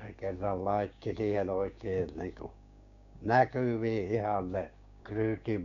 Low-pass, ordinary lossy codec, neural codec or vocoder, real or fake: 7.2 kHz; none; codec, 16 kHz, 6 kbps, DAC; fake